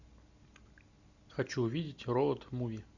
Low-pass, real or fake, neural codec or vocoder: 7.2 kHz; real; none